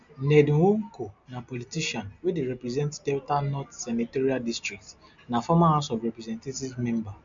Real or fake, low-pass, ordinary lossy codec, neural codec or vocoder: real; 7.2 kHz; MP3, 64 kbps; none